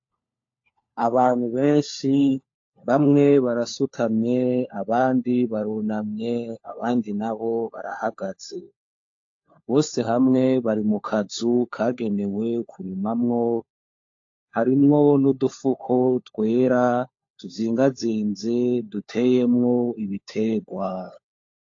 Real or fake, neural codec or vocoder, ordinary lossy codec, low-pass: fake; codec, 16 kHz, 4 kbps, FunCodec, trained on LibriTTS, 50 frames a second; AAC, 48 kbps; 7.2 kHz